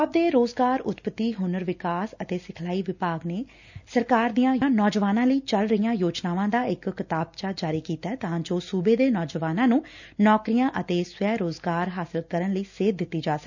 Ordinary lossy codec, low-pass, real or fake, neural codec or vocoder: none; 7.2 kHz; real; none